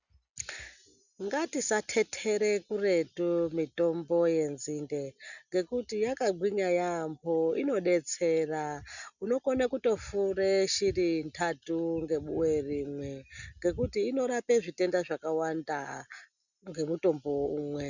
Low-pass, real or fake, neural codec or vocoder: 7.2 kHz; real; none